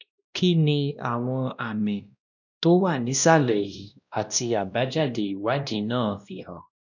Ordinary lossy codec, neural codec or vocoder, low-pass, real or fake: none; codec, 16 kHz, 1 kbps, X-Codec, WavLM features, trained on Multilingual LibriSpeech; 7.2 kHz; fake